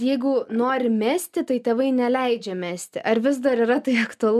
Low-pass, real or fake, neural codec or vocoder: 14.4 kHz; real; none